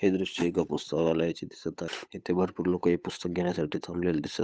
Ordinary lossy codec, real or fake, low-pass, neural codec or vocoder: none; fake; none; codec, 16 kHz, 8 kbps, FunCodec, trained on Chinese and English, 25 frames a second